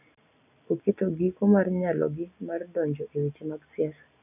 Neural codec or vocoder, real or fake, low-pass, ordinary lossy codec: none; real; 3.6 kHz; none